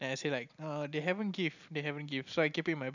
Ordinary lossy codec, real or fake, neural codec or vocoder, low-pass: none; real; none; 7.2 kHz